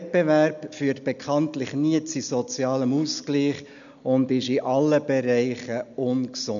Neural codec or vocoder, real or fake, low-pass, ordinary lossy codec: none; real; 7.2 kHz; none